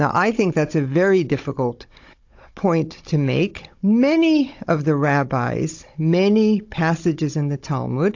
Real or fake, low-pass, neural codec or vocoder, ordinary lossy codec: fake; 7.2 kHz; codec, 16 kHz, 16 kbps, FunCodec, trained on Chinese and English, 50 frames a second; AAC, 48 kbps